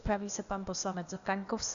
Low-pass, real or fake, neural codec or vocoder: 7.2 kHz; fake; codec, 16 kHz, 0.8 kbps, ZipCodec